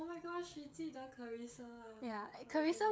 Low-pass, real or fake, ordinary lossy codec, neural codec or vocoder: none; fake; none; codec, 16 kHz, 16 kbps, FreqCodec, smaller model